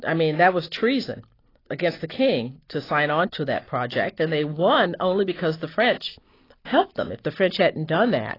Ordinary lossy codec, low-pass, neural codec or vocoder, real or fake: AAC, 24 kbps; 5.4 kHz; none; real